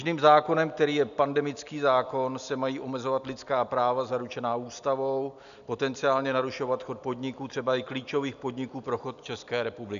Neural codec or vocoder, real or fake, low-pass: none; real; 7.2 kHz